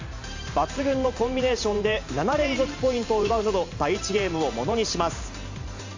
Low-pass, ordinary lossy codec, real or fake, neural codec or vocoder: 7.2 kHz; AAC, 48 kbps; fake; vocoder, 44.1 kHz, 128 mel bands every 512 samples, BigVGAN v2